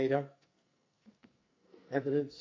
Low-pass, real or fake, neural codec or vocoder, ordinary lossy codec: 7.2 kHz; fake; codec, 44.1 kHz, 2.6 kbps, SNAC; MP3, 48 kbps